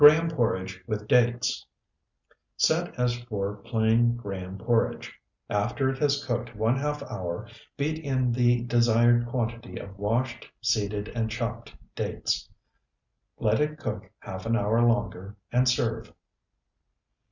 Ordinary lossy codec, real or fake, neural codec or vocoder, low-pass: Opus, 64 kbps; real; none; 7.2 kHz